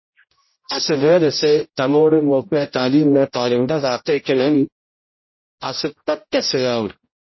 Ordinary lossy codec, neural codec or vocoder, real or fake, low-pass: MP3, 24 kbps; codec, 16 kHz, 0.5 kbps, X-Codec, HuBERT features, trained on general audio; fake; 7.2 kHz